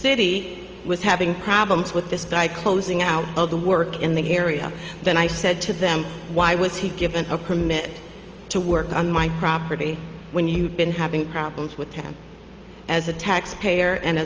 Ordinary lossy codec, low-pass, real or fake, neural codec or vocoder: Opus, 24 kbps; 7.2 kHz; real; none